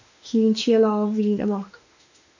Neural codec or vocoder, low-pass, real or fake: autoencoder, 48 kHz, 32 numbers a frame, DAC-VAE, trained on Japanese speech; 7.2 kHz; fake